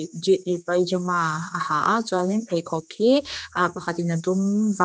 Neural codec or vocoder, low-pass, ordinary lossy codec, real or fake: codec, 16 kHz, 2 kbps, X-Codec, HuBERT features, trained on general audio; none; none; fake